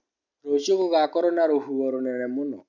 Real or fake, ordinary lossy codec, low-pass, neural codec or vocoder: real; none; 7.2 kHz; none